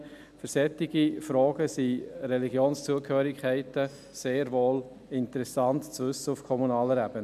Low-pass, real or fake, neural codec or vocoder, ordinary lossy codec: 14.4 kHz; real; none; none